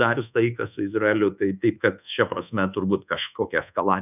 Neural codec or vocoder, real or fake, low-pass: codec, 24 kHz, 1.2 kbps, DualCodec; fake; 3.6 kHz